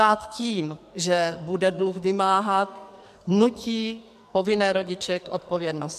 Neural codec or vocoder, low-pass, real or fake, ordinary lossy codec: codec, 44.1 kHz, 2.6 kbps, SNAC; 14.4 kHz; fake; MP3, 96 kbps